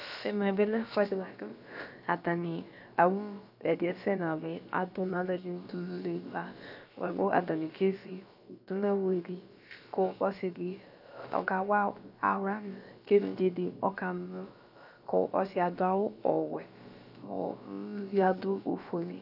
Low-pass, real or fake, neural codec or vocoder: 5.4 kHz; fake; codec, 16 kHz, about 1 kbps, DyCAST, with the encoder's durations